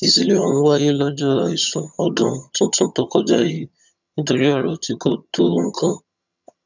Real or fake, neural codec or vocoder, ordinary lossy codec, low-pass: fake; vocoder, 22.05 kHz, 80 mel bands, HiFi-GAN; none; 7.2 kHz